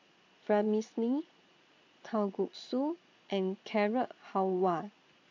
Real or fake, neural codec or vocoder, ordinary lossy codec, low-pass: fake; vocoder, 22.05 kHz, 80 mel bands, Vocos; AAC, 48 kbps; 7.2 kHz